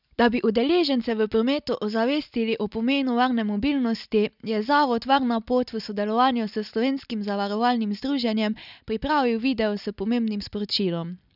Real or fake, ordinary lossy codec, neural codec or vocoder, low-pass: real; none; none; 5.4 kHz